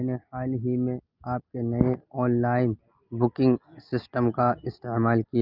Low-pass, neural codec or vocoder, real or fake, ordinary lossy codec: 5.4 kHz; none; real; Opus, 24 kbps